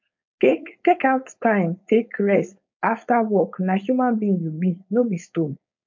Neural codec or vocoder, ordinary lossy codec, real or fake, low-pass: codec, 16 kHz, 4.8 kbps, FACodec; MP3, 48 kbps; fake; 7.2 kHz